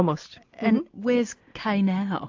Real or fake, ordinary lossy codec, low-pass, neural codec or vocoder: real; AAC, 48 kbps; 7.2 kHz; none